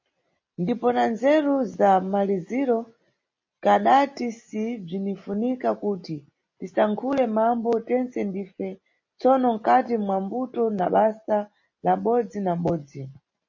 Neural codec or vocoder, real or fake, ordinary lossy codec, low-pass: none; real; MP3, 32 kbps; 7.2 kHz